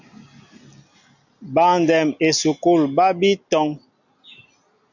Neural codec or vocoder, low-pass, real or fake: none; 7.2 kHz; real